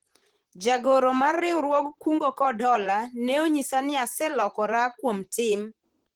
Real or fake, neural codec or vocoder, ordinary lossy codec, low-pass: fake; vocoder, 44.1 kHz, 128 mel bands, Pupu-Vocoder; Opus, 16 kbps; 19.8 kHz